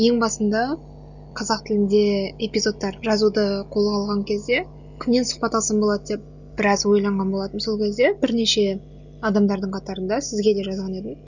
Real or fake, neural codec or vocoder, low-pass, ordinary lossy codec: real; none; 7.2 kHz; none